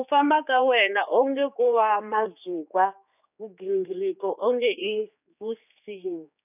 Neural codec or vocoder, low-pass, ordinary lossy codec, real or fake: codec, 16 kHz, 4 kbps, X-Codec, HuBERT features, trained on general audio; 3.6 kHz; none; fake